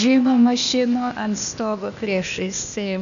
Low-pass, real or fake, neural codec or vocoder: 7.2 kHz; fake; codec, 16 kHz, 0.8 kbps, ZipCodec